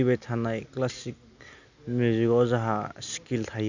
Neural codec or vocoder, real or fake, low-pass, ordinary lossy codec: none; real; 7.2 kHz; none